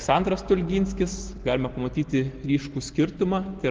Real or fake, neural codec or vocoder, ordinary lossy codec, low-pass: real; none; Opus, 16 kbps; 7.2 kHz